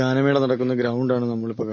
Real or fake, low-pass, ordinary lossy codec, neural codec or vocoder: real; 7.2 kHz; MP3, 32 kbps; none